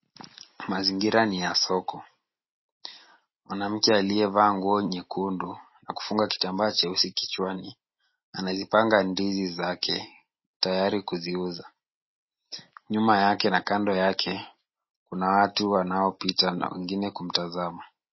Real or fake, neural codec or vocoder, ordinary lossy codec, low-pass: real; none; MP3, 24 kbps; 7.2 kHz